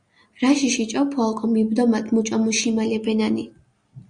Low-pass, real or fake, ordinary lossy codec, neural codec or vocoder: 9.9 kHz; real; Opus, 64 kbps; none